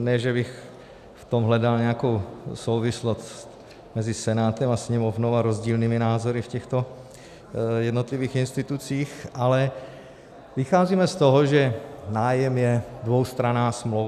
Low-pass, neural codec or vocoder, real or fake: 14.4 kHz; none; real